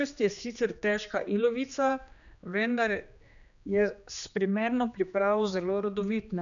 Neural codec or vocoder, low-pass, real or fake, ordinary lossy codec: codec, 16 kHz, 2 kbps, X-Codec, HuBERT features, trained on general audio; 7.2 kHz; fake; none